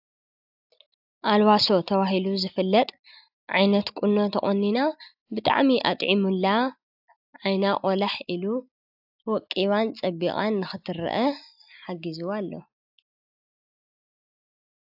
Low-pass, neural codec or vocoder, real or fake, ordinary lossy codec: 5.4 kHz; none; real; AAC, 48 kbps